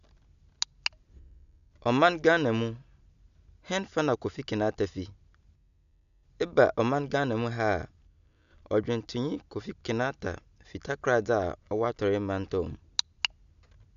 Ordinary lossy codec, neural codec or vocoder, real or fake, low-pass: none; none; real; 7.2 kHz